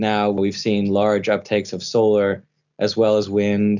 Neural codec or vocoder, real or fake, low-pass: none; real; 7.2 kHz